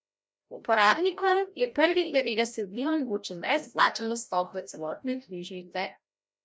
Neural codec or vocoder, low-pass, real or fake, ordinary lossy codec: codec, 16 kHz, 0.5 kbps, FreqCodec, larger model; none; fake; none